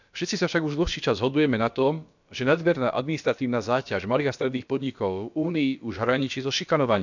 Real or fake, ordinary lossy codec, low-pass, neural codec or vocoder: fake; none; 7.2 kHz; codec, 16 kHz, about 1 kbps, DyCAST, with the encoder's durations